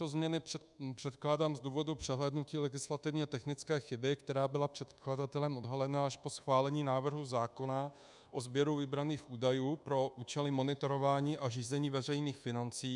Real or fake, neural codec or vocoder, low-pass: fake; codec, 24 kHz, 1.2 kbps, DualCodec; 10.8 kHz